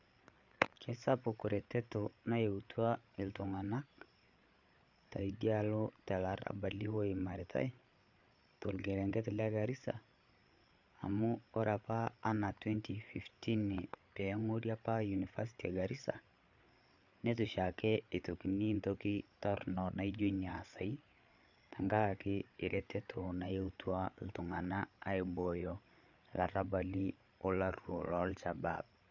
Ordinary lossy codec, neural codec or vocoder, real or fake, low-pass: none; codec, 16 kHz, 8 kbps, FreqCodec, larger model; fake; 7.2 kHz